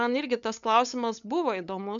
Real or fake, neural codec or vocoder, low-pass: fake; codec, 16 kHz, 8 kbps, FunCodec, trained on LibriTTS, 25 frames a second; 7.2 kHz